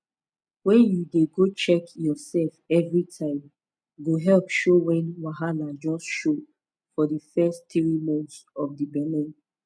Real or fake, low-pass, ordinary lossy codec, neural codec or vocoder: real; none; none; none